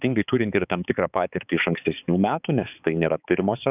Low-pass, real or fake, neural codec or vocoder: 3.6 kHz; fake; codec, 16 kHz, 4 kbps, X-Codec, HuBERT features, trained on balanced general audio